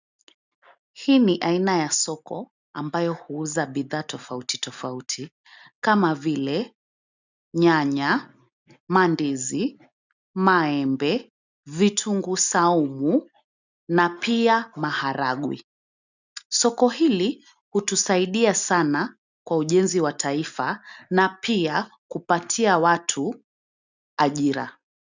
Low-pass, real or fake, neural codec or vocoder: 7.2 kHz; real; none